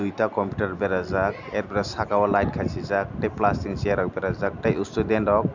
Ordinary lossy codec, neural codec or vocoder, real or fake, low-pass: none; none; real; 7.2 kHz